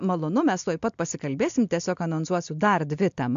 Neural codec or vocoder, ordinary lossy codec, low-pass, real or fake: none; AAC, 64 kbps; 7.2 kHz; real